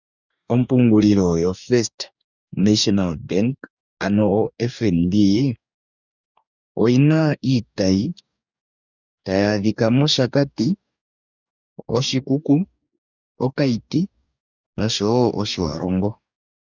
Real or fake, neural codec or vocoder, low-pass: fake; codec, 44.1 kHz, 2.6 kbps, DAC; 7.2 kHz